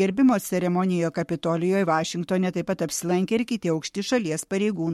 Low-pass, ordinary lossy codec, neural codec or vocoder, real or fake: 19.8 kHz; MP3, 64 kbps; codec, 44.1 kHz, 7.8 kbps, Pupu-Codec; fake